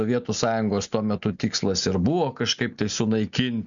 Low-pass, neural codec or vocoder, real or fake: 7.2 kHz; none; real